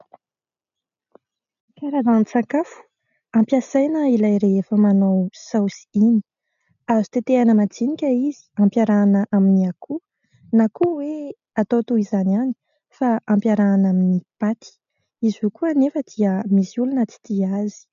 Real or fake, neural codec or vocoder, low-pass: real; none; 7.2 kHz